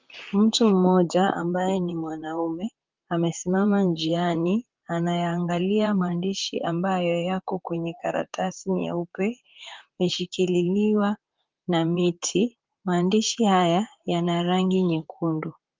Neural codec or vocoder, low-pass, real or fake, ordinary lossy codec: vocoder, 44.1 kHz, 128 mel bands, Pupu-Vocoder; 7.2 kHz; fake; Opus, 24 kbps